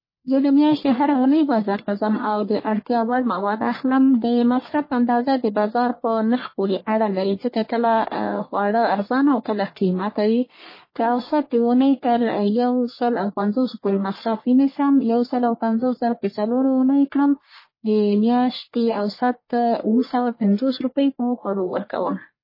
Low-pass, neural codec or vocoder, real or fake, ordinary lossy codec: 5.4 kHz; codec, 44.1 kHz, 1.7 kbps, Pupu-Codec; fake; MP3, 24 kbps